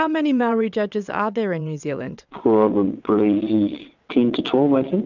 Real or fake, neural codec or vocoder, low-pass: fake; vocoder, 22.05 kHz, 80 mel bands, WaveNeXt; 7.2 kHz